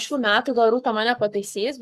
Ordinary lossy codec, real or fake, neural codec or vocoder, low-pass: Opus, 64 kbps; fake; codec, 44.1 kHz, 7.8 kbps, Pupu-Codec; 14.4 kHz